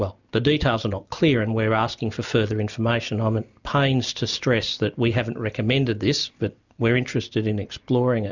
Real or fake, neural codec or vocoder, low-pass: real; none; 7.2 kHz